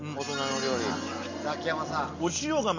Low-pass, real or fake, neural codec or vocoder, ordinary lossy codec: 7.2 kHz; real; none; none